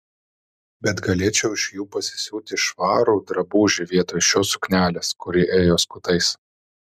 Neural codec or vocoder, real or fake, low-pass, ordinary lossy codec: none; real; 14.4 kHz; MP3, 96 kbps